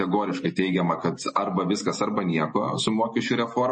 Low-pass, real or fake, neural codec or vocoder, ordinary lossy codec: 9.9 kHz; real; none; MP3, 32 kbps